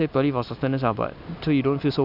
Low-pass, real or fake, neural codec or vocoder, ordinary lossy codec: 5.4 kHz; real; none; none